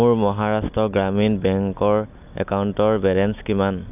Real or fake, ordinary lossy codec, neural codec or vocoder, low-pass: real; none; none; 3.6 kHz